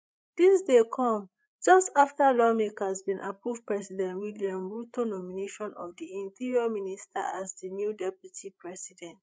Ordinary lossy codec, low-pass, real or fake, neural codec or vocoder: none; none; fake; codec, 16 kHz, 8 kbps, FreqCodec, larger model